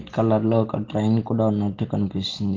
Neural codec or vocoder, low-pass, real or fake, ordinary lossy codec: none; 7.2 kHz; real; Opus, 16 kbps